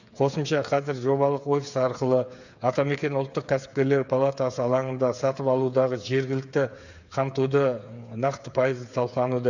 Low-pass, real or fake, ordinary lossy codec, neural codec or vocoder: 7.2 kHz; fake; none; codec, 16 kHz, 8 kbps, FreqCodec, smaller model